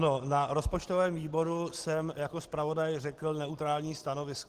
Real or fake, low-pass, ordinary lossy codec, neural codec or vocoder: real; 14.4 kHz; Opus, 16 kbps; none